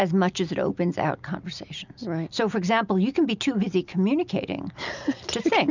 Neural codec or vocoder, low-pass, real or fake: none; 7.2 kHz; real